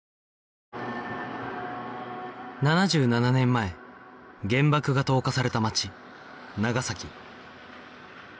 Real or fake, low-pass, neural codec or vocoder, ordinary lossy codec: real; none; none; none